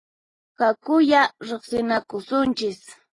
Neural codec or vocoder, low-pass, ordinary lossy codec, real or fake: none; 10.8 kHz; AAC, 32 kbps; real